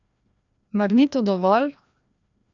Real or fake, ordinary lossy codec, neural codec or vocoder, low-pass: fake; none; codec, 16 kHz, 1 kbps, FreqCodec, larger model; 7.2 kHz